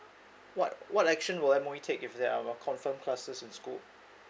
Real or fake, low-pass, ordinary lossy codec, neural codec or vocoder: real; none; none; none